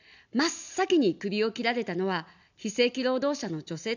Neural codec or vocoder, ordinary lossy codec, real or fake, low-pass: none; none; real; 7.2 kHz